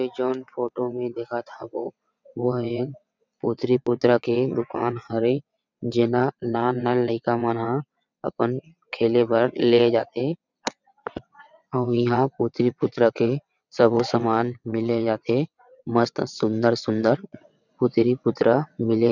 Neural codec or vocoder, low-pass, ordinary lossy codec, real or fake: vocoder, 22.05 kHz, 80 mel bands, WaveNeXt; 7.2 kHz; none; fake